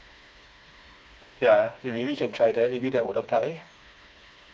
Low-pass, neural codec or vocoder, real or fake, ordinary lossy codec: none; codec, 16 kHz, 2 kbps, FreqCodec, smaller model; fake; none